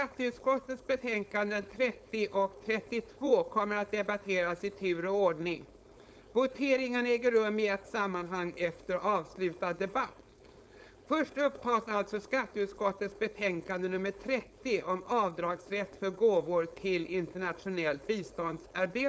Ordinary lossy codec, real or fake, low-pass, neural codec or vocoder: none; fake; none; codec, 16 kHz, 4.8 kbps, FACodec